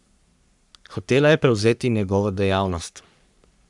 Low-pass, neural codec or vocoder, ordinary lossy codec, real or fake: 10.8 kHz; codec, 44.1 kHz, 3.4 kbps, Pupu-Codec; none; fake